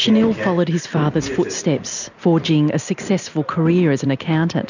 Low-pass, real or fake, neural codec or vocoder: 7.2 kHz; real; none